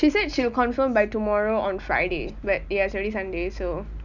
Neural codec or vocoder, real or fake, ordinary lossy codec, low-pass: none; real; none; 7.2 kHz